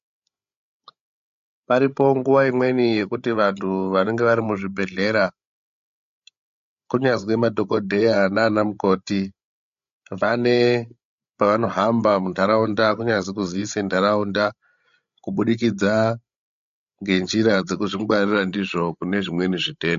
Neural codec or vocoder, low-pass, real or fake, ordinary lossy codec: codec, 16 kHz, 16 kbps, FreqCodec, larger model; 7.2 kHz; fake; MP3, 48 kbps